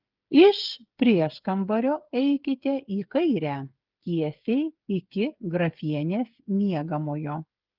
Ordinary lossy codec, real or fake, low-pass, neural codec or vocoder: Opus, 32 kbps; fake; 5.4 kHz; codec, 16 kHz, 8 kbps, FreqCodec, smaller model